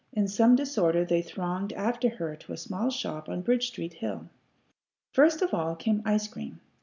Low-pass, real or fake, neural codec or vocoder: 7.2 kHz; real; none